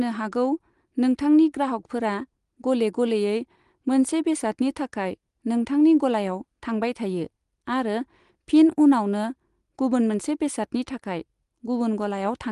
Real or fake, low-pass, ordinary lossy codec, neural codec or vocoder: real; 10.8 kHz; Opus, 24 kbps; none